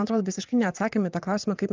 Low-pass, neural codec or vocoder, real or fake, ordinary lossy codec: 7.2 kHz; vocoder, 22.05 kHz, 80 mel bands, WaveNeXt; fake; Opus, 24 kbps